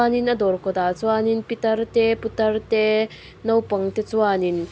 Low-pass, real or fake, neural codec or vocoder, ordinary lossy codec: none; real; none; none